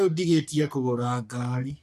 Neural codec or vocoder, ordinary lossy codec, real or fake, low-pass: codec, 44.1 kHz, 3.4 kbps, Pupu-Codec; none; fake; 14.4 kHz